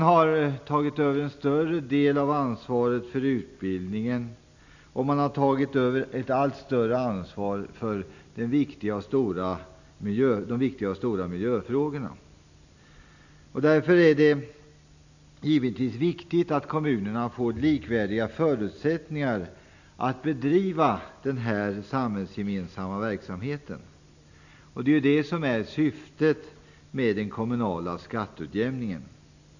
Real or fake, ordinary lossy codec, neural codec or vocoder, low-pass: real; none; none; 7.2 kHz